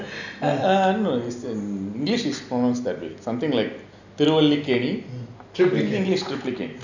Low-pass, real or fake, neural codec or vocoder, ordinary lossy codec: 7.2 kHz; real; none; none